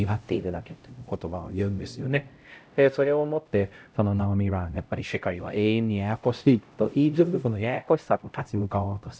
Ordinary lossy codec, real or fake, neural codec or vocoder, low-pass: none; fake; codec, 16 kHz, 0.5 kbps, X-Codec, HuBERT features, trained on LibriSpeech; none